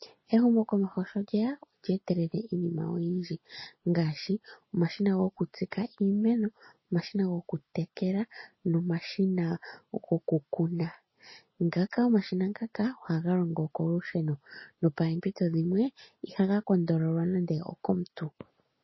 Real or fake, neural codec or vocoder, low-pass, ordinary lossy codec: real; none; 7.2 kHz; MP3, 24 kbps